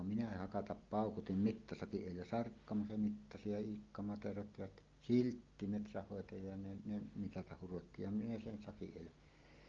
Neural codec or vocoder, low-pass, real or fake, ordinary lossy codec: none; 7.2 kHz; real; Opus, 32 kbps